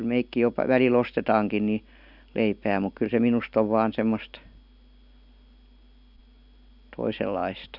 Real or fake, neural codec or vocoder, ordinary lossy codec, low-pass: real; none; none; 5.4 kHz